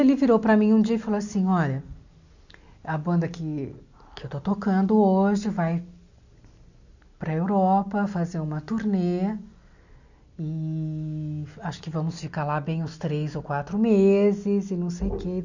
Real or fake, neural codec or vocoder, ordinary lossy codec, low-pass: real; none; none; 7.2 kHz